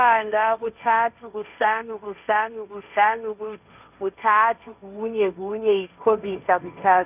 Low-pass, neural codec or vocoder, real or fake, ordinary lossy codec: 3.6 kHz; codec, 16 kHz, 1.1 kbps, Voila-Tokenizer; fake; none